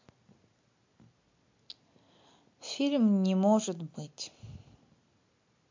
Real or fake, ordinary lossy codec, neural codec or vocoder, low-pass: real; MP3, 48 kbps; none; 7.2 kHz